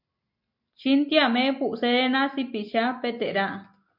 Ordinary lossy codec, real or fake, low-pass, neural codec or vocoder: MP3, 48 kbps; real; 5.4 kHz; none